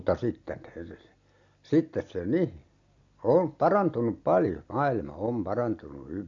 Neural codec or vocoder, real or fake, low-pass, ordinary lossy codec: none; real; 7.2 kHz; AAC, 48 kbps